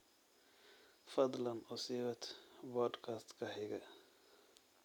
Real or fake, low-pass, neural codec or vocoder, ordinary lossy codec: real; 19.8 kHz; none; none